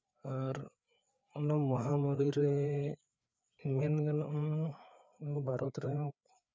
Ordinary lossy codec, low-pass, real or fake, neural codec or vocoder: none; none; fake; codec, 16 kHz, 4 kbps, FreqCodec, larger model